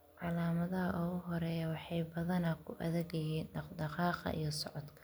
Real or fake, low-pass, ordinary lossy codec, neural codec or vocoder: real; none; none; none